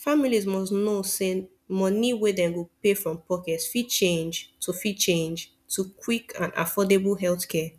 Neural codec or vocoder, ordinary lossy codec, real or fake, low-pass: none; none; real; 14.4 kHz